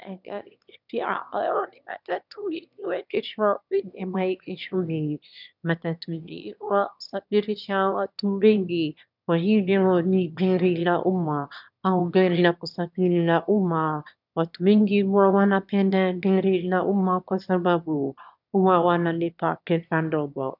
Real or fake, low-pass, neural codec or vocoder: fake; 5.4 kHz; autoencoder, 22.05 kHz, a latent of 192 numbers a frame, VITS, trained on one speaker